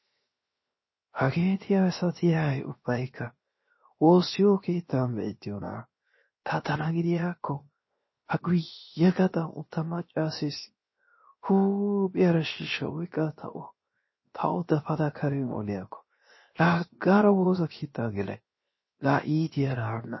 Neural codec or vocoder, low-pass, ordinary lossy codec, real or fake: codec, 16 kHz, 0.7 kbps, FocalCodec; 7.2 kHz; MP3, 24 kbps; fake